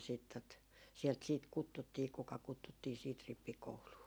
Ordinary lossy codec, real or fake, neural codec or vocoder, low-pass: none; real; none; none